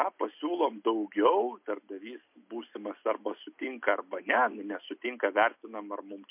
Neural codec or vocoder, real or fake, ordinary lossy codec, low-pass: none; real; MP3, 32 kbps; 3.6 kHz